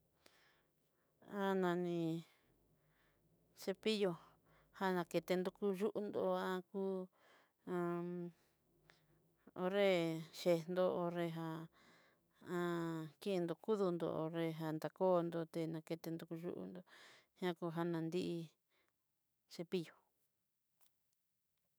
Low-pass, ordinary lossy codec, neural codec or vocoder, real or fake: none; none; autoencoder, 48 kHz, 128 numbers a frame, DAC-VAE, trained on Japanese speech; fake